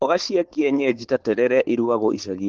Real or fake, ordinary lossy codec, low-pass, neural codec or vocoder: fake; Opus, 16 kbps; 7.2 kHz; codec, 16 kHz, 4.8 kbps, FACodec